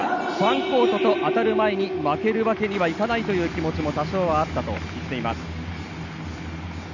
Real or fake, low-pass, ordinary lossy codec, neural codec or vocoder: real; 7.2 kHz; none; none